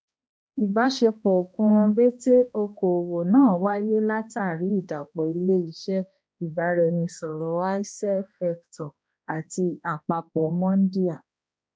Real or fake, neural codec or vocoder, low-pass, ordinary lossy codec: fake; codec, 16 kHz, 1 kbps, X-Codec, HuBERT features, trained on balanced general audio; none; none